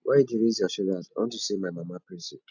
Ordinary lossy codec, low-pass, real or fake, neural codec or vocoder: none; 7.2 kHz; real; none